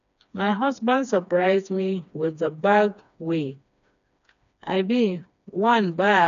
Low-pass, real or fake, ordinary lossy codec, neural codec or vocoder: 7.2 kHz; fake; none; codec, 16 kHz, 2 kbps, FreqCodec, smaller model